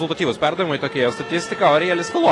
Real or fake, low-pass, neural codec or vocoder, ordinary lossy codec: real; 10.8 kHz; none; AAC, 32 kbps